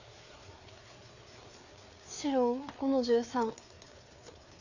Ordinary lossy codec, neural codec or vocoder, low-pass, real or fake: none; codec, 16 kHz, 4 kbps, FreqCodec, larger model; 7.2 kHz; fake